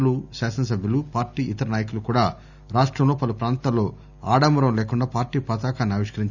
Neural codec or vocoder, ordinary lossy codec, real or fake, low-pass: none; none; real; 7.2 kHz